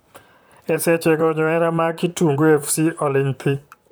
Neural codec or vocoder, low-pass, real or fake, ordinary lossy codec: vocoder, 44.1 kHz, 128 mel bands, Pupu-Vocoder; none; fake; none